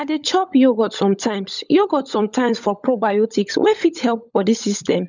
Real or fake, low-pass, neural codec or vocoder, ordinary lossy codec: fake; 7.2 kHz; codec, 16 kHz, 16 kbps, FunCodec, trained on LibriTTS, 50 frames a second; none